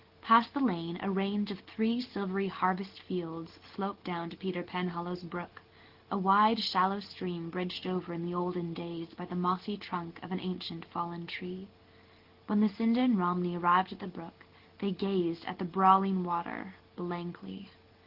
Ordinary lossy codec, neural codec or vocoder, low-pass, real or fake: Opus, 16 kbps; none; 5.4 kHz; real